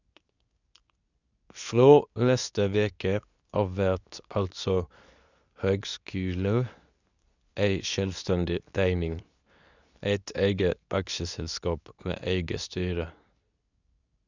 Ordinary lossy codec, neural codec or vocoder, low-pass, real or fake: none; codec, 24 kHz, 0.9 kbps, WavTokenizer, medium speech release version 2; 7.2 kHz; fake